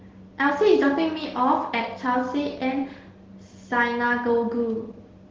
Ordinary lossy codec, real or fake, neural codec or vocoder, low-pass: Opus, 16 kbps; real; none; 7.2 kHz